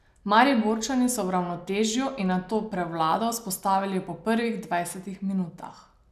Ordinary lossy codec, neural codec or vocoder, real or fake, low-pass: none; none; real; 14.4 kHz